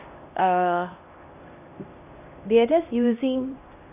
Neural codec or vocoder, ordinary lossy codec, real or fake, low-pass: codec, 16 kHz, 1 kbps, X-Codec, HuBERT features, trained on LibriSpeech; none; fake; 3.6 kHz